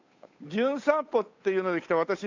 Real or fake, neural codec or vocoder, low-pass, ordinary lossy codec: fake; codec, 16 kHz, 8 kbps, FunCodec, trained on Chinese and English, 25 frames a second; 7.2 kHz; AAC, 48 kbps